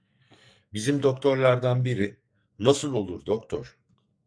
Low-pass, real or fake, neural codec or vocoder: 9.9 kHz; fake; codec, 44.1 kHz, 2.6 kbps, SNAC